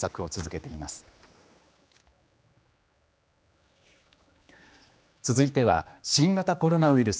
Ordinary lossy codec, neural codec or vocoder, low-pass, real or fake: none; codec, 16 kHz, 2 kbps, X-Codec, HuBERT features, trained on general audio; none; fake